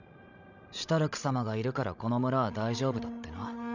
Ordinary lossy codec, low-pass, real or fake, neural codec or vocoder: none; 7.2 kHz; fake; codec, 16 kHz, 16 kbps, FreqCodec, larger model